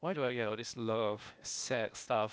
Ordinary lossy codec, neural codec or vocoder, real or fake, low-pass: none; codec, 16 kHz, 0.8 kbps, ZipCodec; fake; none